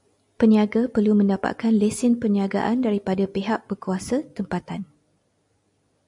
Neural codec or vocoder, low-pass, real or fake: none; 10.8 kHz; real